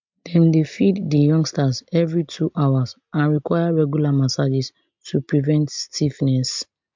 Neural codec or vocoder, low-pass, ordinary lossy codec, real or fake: none; 7.2 kHz; MP3, 64 kbps; real